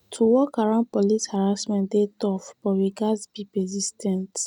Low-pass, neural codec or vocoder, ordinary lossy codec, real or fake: 19.8 kHz; none; none; real